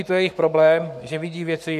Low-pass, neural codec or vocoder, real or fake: 14.4 kHz; codec, 44.1 kHz, 7.8 kbps, Pupu-Codec; fake